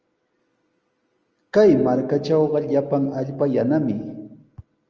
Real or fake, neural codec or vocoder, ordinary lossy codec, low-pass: real; none; Opus, 24 kbps; 7.2 kHz